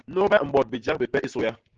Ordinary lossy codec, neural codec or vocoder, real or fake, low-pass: Opus, 16 kbps; none; real; 7.2 kHz